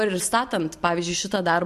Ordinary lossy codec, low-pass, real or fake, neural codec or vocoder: MP3, 64 kbps; 14.4 kHz; real; none